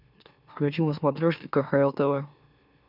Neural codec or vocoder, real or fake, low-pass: autoencoder, 44.1 kHz, a latent of 192 numbers a frame, MeloTTS; fake; 5.4 kHz